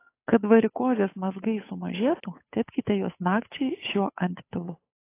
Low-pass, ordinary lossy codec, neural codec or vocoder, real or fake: 3.6 kHz; AAC, 24 kbps; codec, 16 kHz, 8 kbps, FunCodec, trained on Chinese and English, 25 frames a second; fake